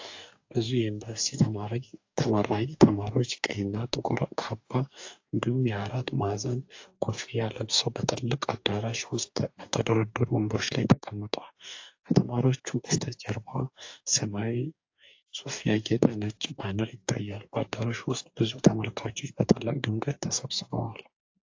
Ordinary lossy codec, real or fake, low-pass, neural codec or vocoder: AAC, 48 kbps; fake; 7.2 kHz; codec, 44.1 kHz, 2.6 kbps, DAC